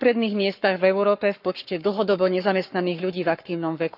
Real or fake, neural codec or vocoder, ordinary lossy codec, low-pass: fake; codec, 44.1 kHz, 7.8 kbps, Pupu-Codec; none; 5.4 kHz